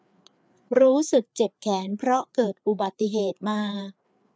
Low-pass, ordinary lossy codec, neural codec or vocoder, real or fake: none; none; codec, 16 kHz, 4 kbps, FreqCodec, larger model; fake